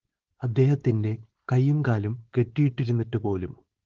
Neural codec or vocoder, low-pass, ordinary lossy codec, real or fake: codec, 16 kHz, 4.8 kbps, FACodec; 7.2 kHz; Opus, 16 kbps; fake